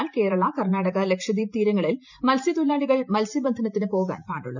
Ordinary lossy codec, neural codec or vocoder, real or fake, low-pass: none; vocoder, 44.1 kHz, 128 mel bands every 512 samples, BigVGAN v2; fake; 7.2 kHz